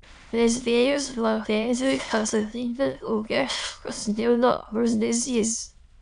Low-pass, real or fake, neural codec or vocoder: 9.9 kHz; fake; autoencoder, 22.05 kHz, a latent of 192 numbers a frame, VITS, trained on many speakers